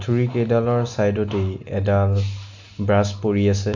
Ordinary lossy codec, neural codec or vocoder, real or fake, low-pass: none; none; real; 7.2 kHz